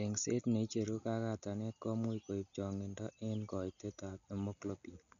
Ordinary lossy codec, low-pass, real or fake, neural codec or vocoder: none; 7.2 kHz; real; none